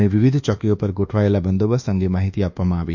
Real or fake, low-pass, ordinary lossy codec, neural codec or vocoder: fake; 7.2 kHz; none; codec, 24 kHz, 1.2 kbps, DualCodec